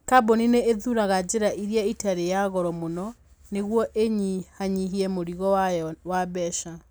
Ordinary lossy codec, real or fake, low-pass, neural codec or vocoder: none; real; none; none